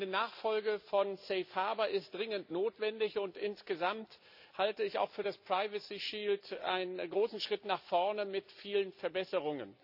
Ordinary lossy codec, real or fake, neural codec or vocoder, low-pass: none; real; none; 5.4 kHz